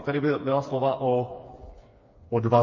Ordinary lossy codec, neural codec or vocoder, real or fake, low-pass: MP3, 32 kbps; codec, 16 kHz, 4 kbps, FreqCodec, smaller model; fake; 7.2 kHz